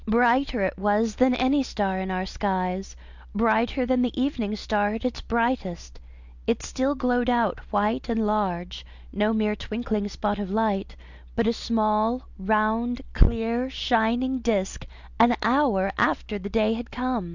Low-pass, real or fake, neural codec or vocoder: 7.2 kHz; real; none